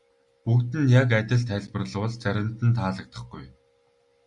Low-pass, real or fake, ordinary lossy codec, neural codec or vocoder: 10.8 kHz; real; Opus, 64 kbps; none